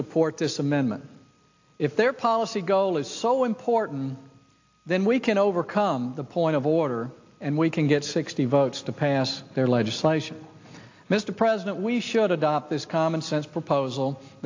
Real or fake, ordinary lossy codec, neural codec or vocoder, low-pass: real; AAC, 48 kbps; none; 7.2 kHz